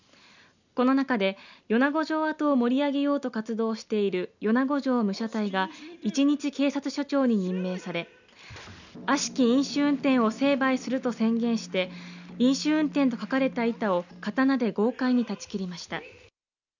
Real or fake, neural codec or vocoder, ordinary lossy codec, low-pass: real; none; none; 7.2 kHz